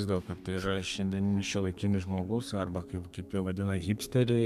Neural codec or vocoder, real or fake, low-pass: codec, 32 kHz, 1.9 kbps, SNAC; fake; 14.4 kHz